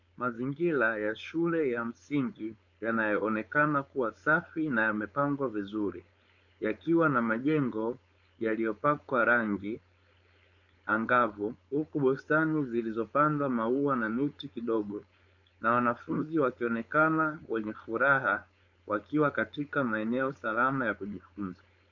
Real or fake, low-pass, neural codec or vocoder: fake; 7.2 kHz; codec, 16 kHz, 4.8 kbps, FACodec